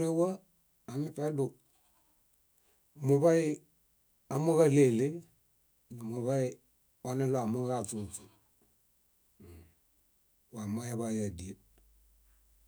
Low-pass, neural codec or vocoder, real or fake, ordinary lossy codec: none; autoencoder, 48 kHz, 128 numbers a frame, DAC-VAE, trained on Japanese speech; fake; none